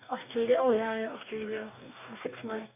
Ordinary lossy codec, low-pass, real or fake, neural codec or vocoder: none; 3.6 kHz; fake; codec, 44.1 kHz, 2.6 kbps, DAC